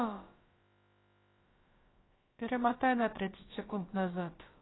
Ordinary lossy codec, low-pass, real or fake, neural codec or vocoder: AAC, 16 kbps; 7.2 kHz; fake; codec, 16 kHz, about 1 kbps, DyCAST, with the encoder's durations